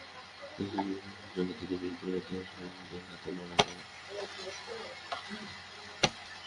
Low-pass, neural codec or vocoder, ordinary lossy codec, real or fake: 10.8 kHz; none; MP3, 96 kbps; real